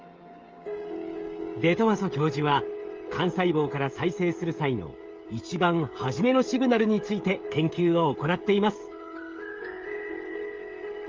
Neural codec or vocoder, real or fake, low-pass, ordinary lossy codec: codec, 16 kHz, 16 kbps, FreqCodec, smaller model; fake; 7.2 kHz; Opus, 32 kbps